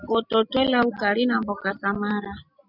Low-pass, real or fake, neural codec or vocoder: 5.4 kHz; real; none